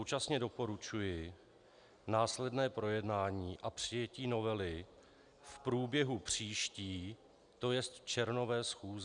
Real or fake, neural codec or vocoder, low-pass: real; none; 9.9 kHz